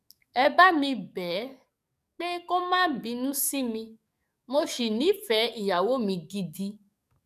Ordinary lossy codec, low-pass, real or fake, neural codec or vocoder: none; 14.4 kHz; fake; codec, 44.1 kHz, 7.8 kbps, DAC